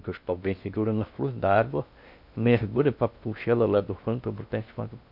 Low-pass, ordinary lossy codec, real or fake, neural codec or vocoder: 5.4 kHz; none; fake; codec, 16 kHz in and 24 kHz out, 0.6 kbps, FocalCodec, streaming, 2048 codes